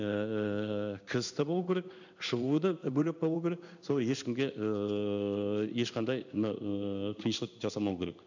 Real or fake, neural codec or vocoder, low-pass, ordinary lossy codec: fake; codec, 16 kHz in and 24 kHz out, 1 kbps, XY-Tokenizer; 7.2 kHz; none